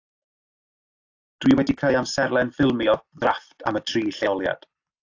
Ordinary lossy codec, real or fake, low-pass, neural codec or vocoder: AAC, 48 kbps; real; 7.2 kHz; none